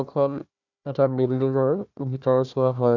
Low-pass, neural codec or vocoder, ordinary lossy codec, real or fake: 7.2 kHz; codec, 16 kHz, 1 kbps, FunCodec, trained on Chinese and English, 50 frames a second; none; fake